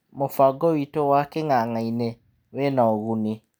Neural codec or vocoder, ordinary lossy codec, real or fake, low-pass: none; none; real; none